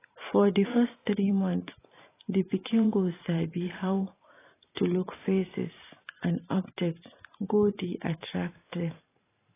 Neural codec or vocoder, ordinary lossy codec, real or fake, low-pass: vocoder, 44.1 kHz, 128 mel bands every 512 samples, BigVGAN v2; AAC, 16 kbps; fake; 3.6 kHz